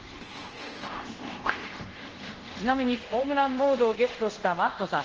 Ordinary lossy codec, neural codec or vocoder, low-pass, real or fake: Opus, 16 kbps; codec, 24 kHz, 0.5 kbps, DualCodec; 7.2 kHz; fake